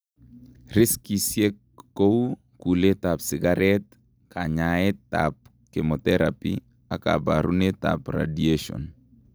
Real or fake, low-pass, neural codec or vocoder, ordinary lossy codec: real; none; none; none